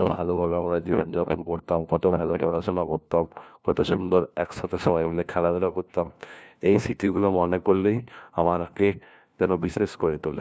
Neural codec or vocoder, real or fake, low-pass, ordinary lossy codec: codec, 16 kHz, 1 kbps, FunCodec, trained on LibriTTS, 50 frames a second; fake; none; none